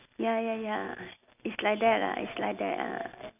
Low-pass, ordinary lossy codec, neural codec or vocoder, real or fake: 3.6 kHz; none; none; real